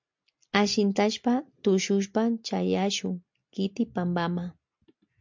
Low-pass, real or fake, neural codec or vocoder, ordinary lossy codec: 7.2 kHz; real; none; MP3, 64 kbps